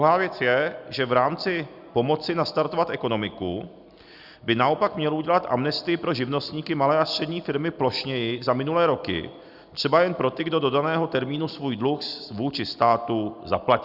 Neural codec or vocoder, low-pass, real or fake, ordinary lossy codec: none; 5.4 kHz; real; Opus, 64 kbps